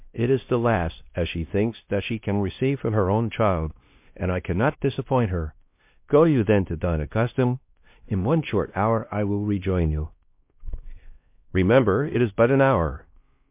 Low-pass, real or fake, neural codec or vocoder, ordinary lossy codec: 3.6 kHz; fake; codec, 16 kHz, 1 kbps, X-Codec, WavLM features, trained on Multilingual LibriSpeech; MP3, 32 kbps